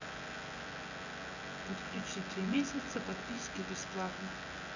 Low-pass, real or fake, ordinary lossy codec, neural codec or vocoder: 7.2 kHz; fake; none; vocoder, 24 kHz, 100 mel bands, Vocos